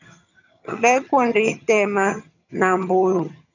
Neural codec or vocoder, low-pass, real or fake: vocoder, 22.05 kHz, 80 mel bands, HiFi-GAN; 7.2 kHz; fake